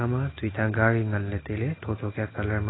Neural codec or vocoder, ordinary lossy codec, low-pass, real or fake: none; AAC, 16 kbps; 7.2 kHz; real